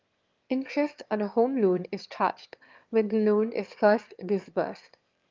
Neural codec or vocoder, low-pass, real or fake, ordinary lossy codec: autoencoder, 22.05 kHz, a latent of 192 numbers a frame, VITS, trained on one speaker; 7.2 kHz; fake; Opus, 32 kbps